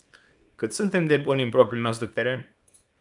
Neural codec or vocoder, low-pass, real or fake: codec, 24 kHz, 0.9 kbps, WavTokenizer, small release; 10.8 kHz; fake